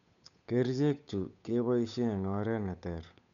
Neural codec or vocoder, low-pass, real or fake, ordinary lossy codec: codec, 16 kHz, 8 kbps, FunCodec, trained on Chinese and English, 25 frames a second; 7.2 kHz; fake; none